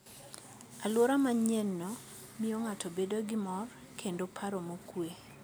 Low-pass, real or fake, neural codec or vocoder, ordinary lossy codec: none; real; none; none